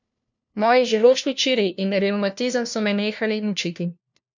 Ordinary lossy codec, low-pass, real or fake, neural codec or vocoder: none; 7.2 kHz; fake; codec, 16 kHz, 1 kbps, FunCodec, trained on LibriTTS, 50 frames a second